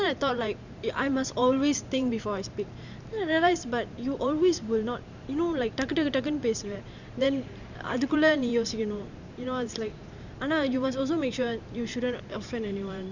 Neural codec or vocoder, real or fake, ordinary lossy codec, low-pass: vocoder, 44.1 kHz, 128 mel bands every 512 samples, BigVGAN v2; fake; none; 7.2 kHz